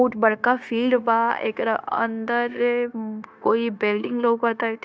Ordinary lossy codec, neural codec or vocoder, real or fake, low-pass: none; codec, 16 kHz, 0.9 kbps, LongCat-Audio-Codec; fake; none